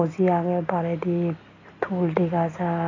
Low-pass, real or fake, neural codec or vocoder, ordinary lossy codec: 7.2 kHz; real; none; AAC, 32 kbps